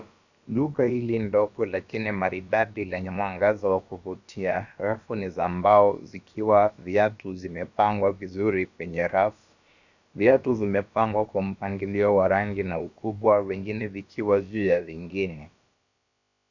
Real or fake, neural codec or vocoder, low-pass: fake; codec, 16 kHz, about 1 kbps, DyCAST, with the encoder's durations; 7.2 kHz